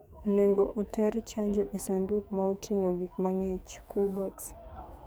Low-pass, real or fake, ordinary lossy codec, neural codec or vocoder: none; fake; none; codec, 44.1 kHz, 2.6 kbps, SNAC